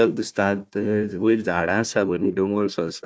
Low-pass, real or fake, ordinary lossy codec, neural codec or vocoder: none; fake; none; codec, 16 kHz, 1 kbps, FunCodec, trained on Chinese and English, 50 frames a second